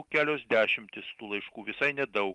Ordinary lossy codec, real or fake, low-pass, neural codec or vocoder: Opus, 32 kbps; real; 10.8 kHz; none